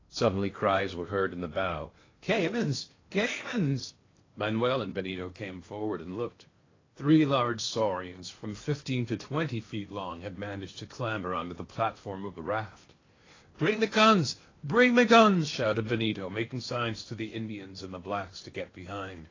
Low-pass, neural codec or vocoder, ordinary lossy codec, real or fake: 7.2 kHz; codec, 16 kHz in and 24 kHz out, 0.8 kbps, FocalCodec, streaming, 65536 codes; AAC, 32 kbps; fake